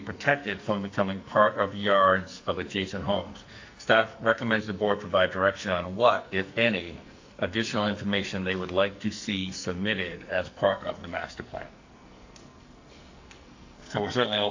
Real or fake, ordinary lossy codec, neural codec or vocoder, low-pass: fake; AAC, 48 kbps; codec, 44.1 kHz, 2.6 kbps, SNAC; 7.2 kHz